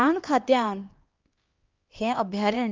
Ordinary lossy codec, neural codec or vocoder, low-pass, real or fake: Opus, 32 kbps; codec, 16 kHz, 2 kbps, X-Codec, HuBERT features, trained on LibriSpeech; 7.2 kHz; fake